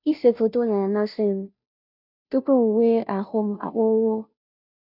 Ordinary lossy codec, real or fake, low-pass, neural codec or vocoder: none; fake; 5.4 kHz; codec, 16 kHz, 0.5 kbps, FunCodec, trained on Chinese and English, 25 frames a second